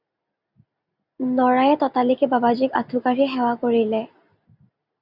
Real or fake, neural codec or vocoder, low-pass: real; none; 5.4 kHz